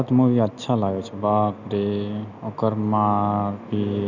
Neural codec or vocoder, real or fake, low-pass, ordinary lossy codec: none; real; 7.2 kHz; none